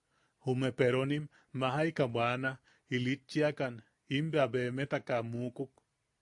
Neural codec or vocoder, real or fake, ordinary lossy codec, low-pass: none; real; AAC, 64 kbps; 10.8 kHz